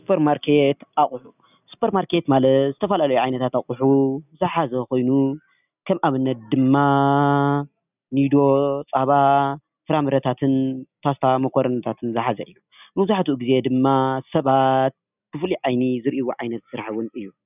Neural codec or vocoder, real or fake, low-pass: none; real; 3.6 kHz